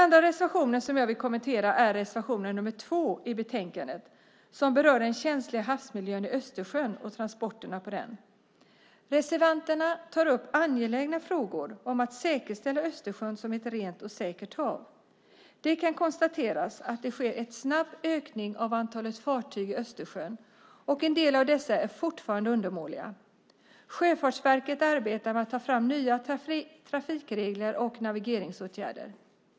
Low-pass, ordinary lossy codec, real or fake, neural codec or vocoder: none; none; real; none